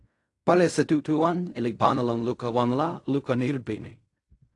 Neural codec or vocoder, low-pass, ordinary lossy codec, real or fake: codec, 16 kHz in and 24 kHz out, 0.4 kbps, LongCat-Audio-Codec, fine tuned four codebook decoder; 10.8 kHz; AAC, 48 kbps; fake